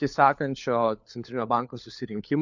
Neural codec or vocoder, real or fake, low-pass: codec, 16 kHz, 4 kbps, FunCodec, trained on LibriTTS, 50 frames a second; fake; 7.2 kHz